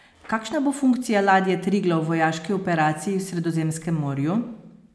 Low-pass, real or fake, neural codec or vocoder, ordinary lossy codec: none; real; none; none